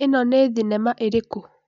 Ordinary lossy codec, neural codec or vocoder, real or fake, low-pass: MP3, 64 kbps; none; real; 7.2 kHz